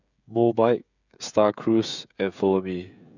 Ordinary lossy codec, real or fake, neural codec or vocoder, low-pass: none; fake; codec, 16 kHz, 16 kbps, FreqCodec, smaller model; 7.2 kHz